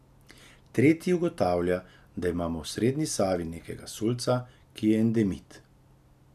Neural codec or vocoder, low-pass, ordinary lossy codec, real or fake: none; 14.4 kHz; none; real